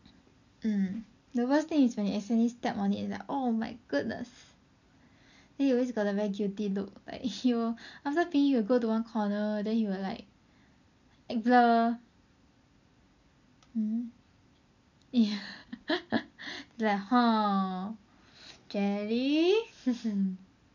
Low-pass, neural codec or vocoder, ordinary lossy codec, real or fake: 7.2 kHz; none; none; real